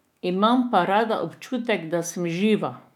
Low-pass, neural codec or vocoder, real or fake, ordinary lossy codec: 19.8 kHz; autoencoder, 48 kHz, 128 numbers a frame, DAC-VAE, trained on Japanese speech; fake; none